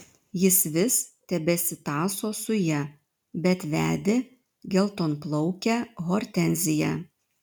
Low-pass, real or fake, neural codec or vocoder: 19.8 kHz; real; none